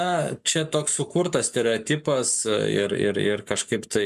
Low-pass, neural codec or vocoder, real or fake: 14.4 kHz; codec, 44.1 kHz, 7.8 kbps, Pupu-Codec; fake